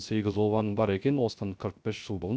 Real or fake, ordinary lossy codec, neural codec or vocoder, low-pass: fake; none; codec, 16 kHz, 0.3 kbps, FocalCodec; none